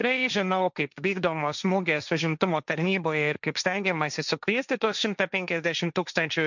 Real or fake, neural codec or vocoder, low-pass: fake; codec, 16 kHz, 1.1 kbps, Voila-Tokenizer; 7.2 kHz